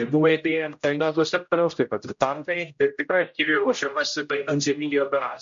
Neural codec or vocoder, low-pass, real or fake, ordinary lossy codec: codec, 16 kHz, 0.5 kbps, X-Codec, HuBERT features, trained on general audio; 7.2 kHz; fake; MP3, 48 kbps